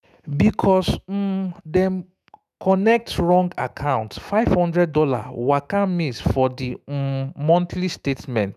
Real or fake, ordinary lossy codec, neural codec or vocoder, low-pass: fake; none; autoencoder, 48 kHz, 128 numbers a frame, DAC-VAE, trained on Japanese speech; 14.4 kHz